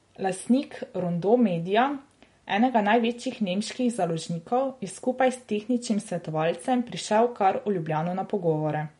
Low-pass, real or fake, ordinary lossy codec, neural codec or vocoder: 10.8 kHz; real; MP3, 48 kbps; none